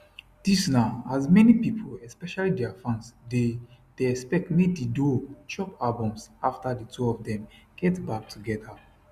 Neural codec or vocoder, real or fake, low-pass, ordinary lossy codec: none; real; 14.4 kHz; Opus, 64 kbps